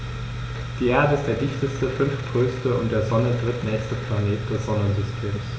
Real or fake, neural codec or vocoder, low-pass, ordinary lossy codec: real; none; none; none